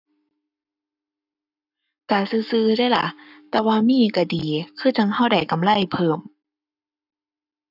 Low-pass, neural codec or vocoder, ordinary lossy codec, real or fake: 5.4 kHz; codec, 44.1 kHz, 7.8 kbps, Pupu-Codec; none; fake